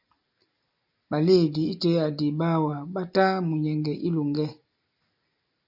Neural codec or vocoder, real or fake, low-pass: none; real; 5.4 kHz